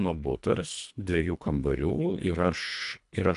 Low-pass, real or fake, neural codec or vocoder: 10.8 kHz; fake; codec, 24 kHz, 1.5 kbps, HILCodec